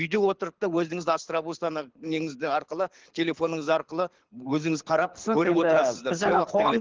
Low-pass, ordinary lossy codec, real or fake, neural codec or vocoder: 7.2 kHz; Opus, 16 kbps; fake; codec, 24 kHz, 6 kbps, HILCodec